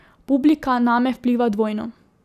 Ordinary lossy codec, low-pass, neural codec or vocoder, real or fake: none; 14.4 kHz; none; real